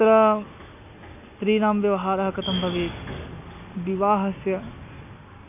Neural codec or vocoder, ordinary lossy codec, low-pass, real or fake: none; MP3, 32 kbps; 3.6 kHz; real